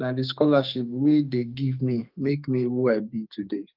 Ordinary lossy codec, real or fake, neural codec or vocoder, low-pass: Opus, 24 kbps; fake; codec, 32 kHz, 1.9 kbps, SNAC; 5.4 kHz